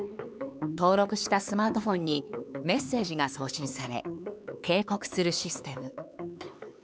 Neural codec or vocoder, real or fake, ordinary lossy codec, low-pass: codec, 16 kHz, 4 kbps, X-Codec, HuBERT features, trained on LibriSpeech; fake; none; none